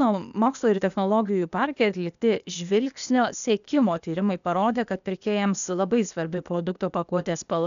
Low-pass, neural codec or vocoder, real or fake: 7.2 kHz; codec, 16 kHz, 0.8 kbps, ZipCodec; fake